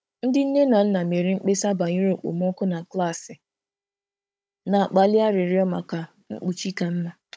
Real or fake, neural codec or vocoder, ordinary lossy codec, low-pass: fake; codec, 16 kHz, 16 kbps, FunCodec, trained on Chinese and English, 50 frames a second; none; none